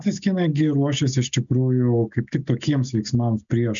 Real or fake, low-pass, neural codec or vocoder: real; 7.2 kHz; none